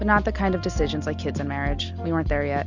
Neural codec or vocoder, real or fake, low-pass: none; real; 7.2 kHz